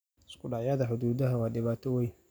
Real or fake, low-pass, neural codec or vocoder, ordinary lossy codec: real; none; none; none